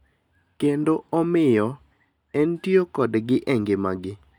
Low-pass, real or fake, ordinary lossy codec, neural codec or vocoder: 19.8 kHz; real; none; none